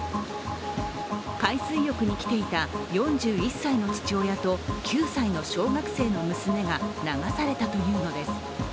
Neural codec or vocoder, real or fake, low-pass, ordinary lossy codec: none; real; none; none